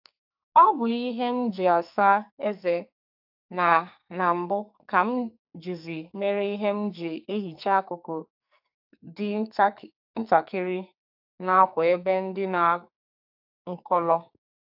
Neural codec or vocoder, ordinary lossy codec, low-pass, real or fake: codec, 44.1 kHz, 2.6 kbps, SNAC; AAC, 48 kbps; 5.4 kHz; fake